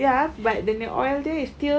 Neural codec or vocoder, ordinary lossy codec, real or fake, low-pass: none; none; real; none